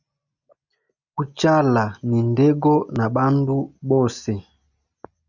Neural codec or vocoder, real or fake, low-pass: none; real; 7.2 kHz